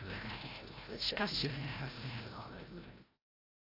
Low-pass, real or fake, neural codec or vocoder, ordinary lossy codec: 5.4 kHz; fake; codec, 16 kHz, 0.5 kbps, FreqCodec, larger model; AAC, 24 kbps